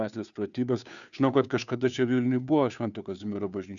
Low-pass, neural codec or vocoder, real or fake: 7.2 kHz; codec, 16 kHz, 2 kbps, FunCodec, trained on Chinese and English, 25 frames a second; fake